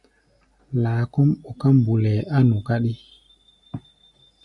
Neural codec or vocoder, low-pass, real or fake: none; 10.8 kHz; real